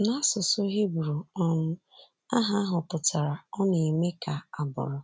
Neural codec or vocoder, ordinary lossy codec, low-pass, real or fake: none; none; none; real